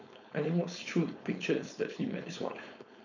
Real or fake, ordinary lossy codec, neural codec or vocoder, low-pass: fake; none; codec, 16 kHz, 4.8 kbps, FACodec; 7.2 kHz